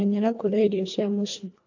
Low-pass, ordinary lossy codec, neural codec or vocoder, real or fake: 7.2 kHz; AAC, 48 kbps; codec, 24 kHz, 1.5 kbps, HILCodec; fake